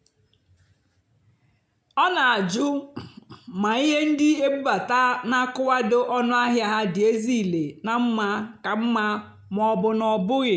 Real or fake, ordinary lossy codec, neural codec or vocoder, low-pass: real; none; none; none